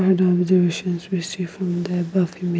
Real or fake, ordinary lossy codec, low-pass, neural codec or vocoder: real; none; none; none